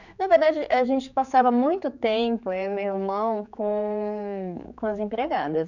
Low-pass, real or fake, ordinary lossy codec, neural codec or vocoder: 7.2 kHz; fake; none; codec, 16 kHz, 4 kbps, X-Codec, HuBERT features, trained on general audio